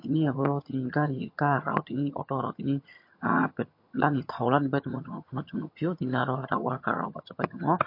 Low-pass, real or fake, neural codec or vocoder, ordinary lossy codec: 5.4 kHz; fake; vocoder, 22.05 kHz, 80 mel bands, HiFi-GAN; MP3, 32 kbps